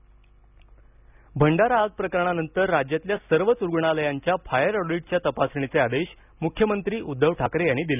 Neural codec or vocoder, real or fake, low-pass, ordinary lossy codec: none; real; 3.6 kHz; none